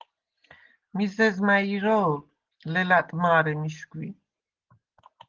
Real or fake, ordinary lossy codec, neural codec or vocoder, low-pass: real; Opus, 16 kbps; none; 7.2 kHz